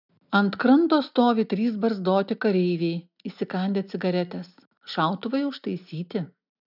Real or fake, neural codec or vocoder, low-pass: real; none; 5.4 kHz